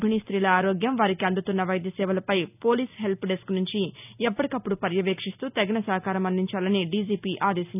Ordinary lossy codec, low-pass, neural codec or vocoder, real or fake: none; 3.6 kHz; none; real